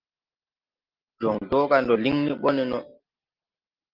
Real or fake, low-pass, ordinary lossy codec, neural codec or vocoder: real; 5.4 kHz; Opus, 32 kbps; none